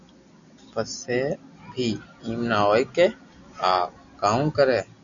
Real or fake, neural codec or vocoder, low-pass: real; none; 7.2 kHz